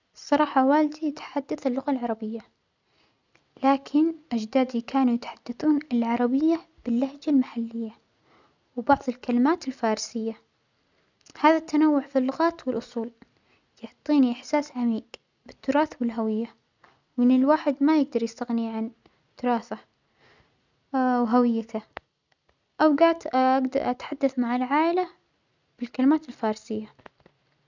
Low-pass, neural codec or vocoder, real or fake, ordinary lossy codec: 7.2 kHz; none; real; none